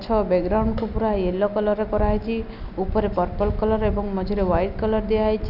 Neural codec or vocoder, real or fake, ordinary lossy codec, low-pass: none; real; none; 5.4 kHz